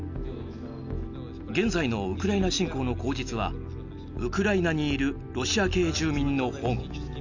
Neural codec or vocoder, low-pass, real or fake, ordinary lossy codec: none; 7.2 kHz; real; none